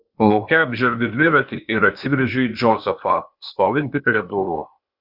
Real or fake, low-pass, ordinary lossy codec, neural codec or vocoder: fake; 5.4 kHz; Opus, 64 kbps; codec, 16 kHz, 0.8 kbps, ZipCodec